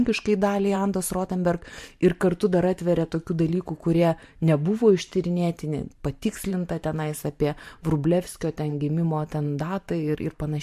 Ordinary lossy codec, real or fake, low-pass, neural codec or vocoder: MP3, 64 kbps; fake; 14.4 kHz; vocoder, 44.1 kHz, 128 mel bands every 512 samples, BigVGAN v2